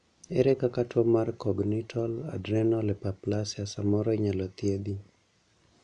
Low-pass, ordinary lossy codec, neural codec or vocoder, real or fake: 9.9 kHz; none; none; real